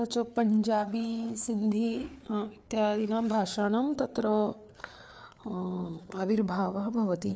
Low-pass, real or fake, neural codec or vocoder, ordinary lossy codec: none; fake; codec, 16 kHz, 4 kbps, FreqCodec, larger model; none